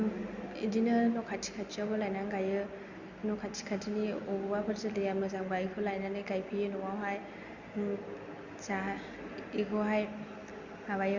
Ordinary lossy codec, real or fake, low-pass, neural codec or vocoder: Opus, 64 kbps; real; 7.2 kHz; none